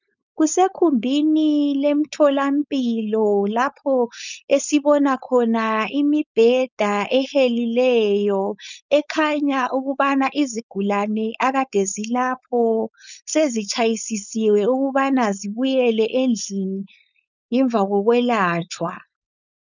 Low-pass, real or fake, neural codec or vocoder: 7.2 kHz; fake; codec, 16 kHz, 4.8 kbps, FACodec